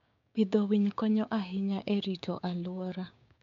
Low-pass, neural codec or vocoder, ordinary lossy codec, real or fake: 7.2 kHz; codec, 16 kHz, 6 kbps, DAC; none; fake